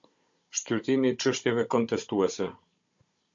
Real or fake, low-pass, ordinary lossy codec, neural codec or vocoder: fake; 7.2 kHz; MP3, 48 kbps; codec, 16 kHz, 16 kbps, FunCodec, trained on Chinese and English, 50 frames a second